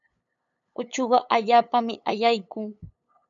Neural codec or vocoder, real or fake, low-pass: codec, 16 kHz, 8 kbps, FunCodec, trained on LibriTTS, 25 frames a second; fake; 7.2 kHz